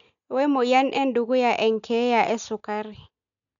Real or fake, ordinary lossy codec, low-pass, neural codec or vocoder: real; none; 7.2 kHz; none